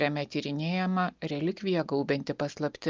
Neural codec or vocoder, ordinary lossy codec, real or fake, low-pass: none; Opus, 24 kbps; real; 7.2 kHz